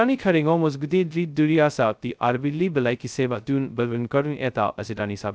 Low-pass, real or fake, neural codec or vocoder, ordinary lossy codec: none; fake; codec, 16 kHz, 0.2 kbps, FocalCodec; none